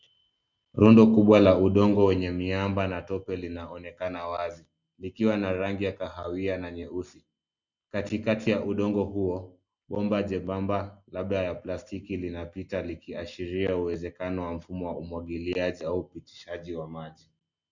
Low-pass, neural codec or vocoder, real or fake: 7.2 kHz; none; real